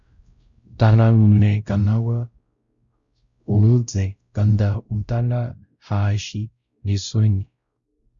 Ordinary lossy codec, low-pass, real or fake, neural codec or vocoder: Opus, 64 kbps; 7.2 kHz; fake; codec, 16 kHz, 0.5 kbps, X-Codec, WavLM features, trained on Multilingual LibriSpeech